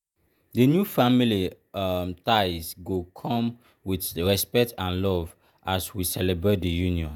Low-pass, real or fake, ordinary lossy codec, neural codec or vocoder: none; fake; none; vocoder, 48 kHz, 128 mel bands, Vocos